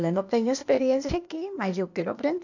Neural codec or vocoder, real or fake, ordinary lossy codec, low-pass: codec, 16 kHz, 0.8 kbps, ZipCodec; fake; none; 7.2 kHz